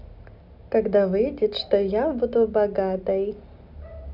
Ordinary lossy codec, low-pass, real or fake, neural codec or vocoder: none; 5.4 kHz; real; none